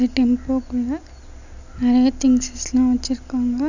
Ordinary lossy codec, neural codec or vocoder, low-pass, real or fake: none; none; 7.2 kHz; real